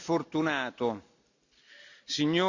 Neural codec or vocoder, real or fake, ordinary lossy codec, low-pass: none; real; Opus, 64 kbps; 7.2 kHz